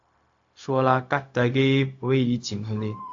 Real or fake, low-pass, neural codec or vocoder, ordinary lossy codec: fake; 7.2 kHz; codec, 16 kHz, 0.4 kbps, LongCat-Audio-Codec; AAC, 48 kbps